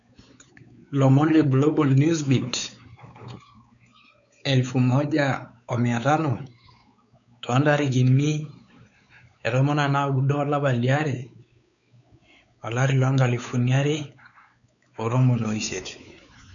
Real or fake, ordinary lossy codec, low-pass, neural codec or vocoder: fake; none; 7.2 kHz; codec, 16 kHz, 4 kbps, X-Codec, WavLM features, trained on Multilingual LibriSpeech